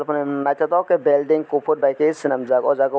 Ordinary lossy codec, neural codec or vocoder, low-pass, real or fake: none; none; none; real